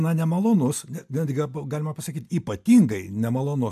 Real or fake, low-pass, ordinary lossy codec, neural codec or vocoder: real; 14.4 kHz; AAC, 96 kbps; none